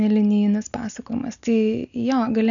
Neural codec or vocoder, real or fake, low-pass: none; real; 7.2 kHz